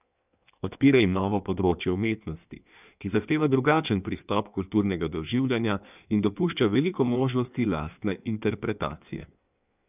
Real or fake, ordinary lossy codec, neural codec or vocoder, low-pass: fake; none; codec, 16 kHz in and 24 kHz out, 1.1 kbps, FireRedTTS-2 codec; 3.6 kHz